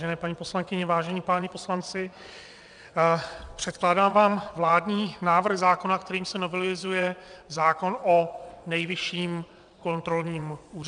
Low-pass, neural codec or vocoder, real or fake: 9.9 kHz; vocoder, 22.05 kHz, 80 mel bands, WaveNeXt; fake